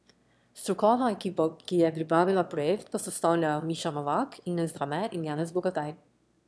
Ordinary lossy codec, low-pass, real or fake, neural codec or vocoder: none; none; fake; autoencoder, 22.05 kHz, a latent of 192 numbers a frame, VITS, trained on one speaker